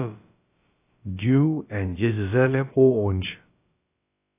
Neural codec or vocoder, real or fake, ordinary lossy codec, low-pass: codec, 16 kHz, about 1 kbps, DyCAST, with the encoder's durations; fake; AAC, 24 kbps; 3.6 kHz